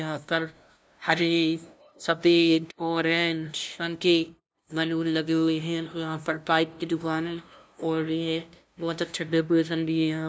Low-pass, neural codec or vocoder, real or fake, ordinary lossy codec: none; codec, 16 kHz, 0.5 kbps, FunCodec, trained on LibriTTS, 25 frames a second; fake; none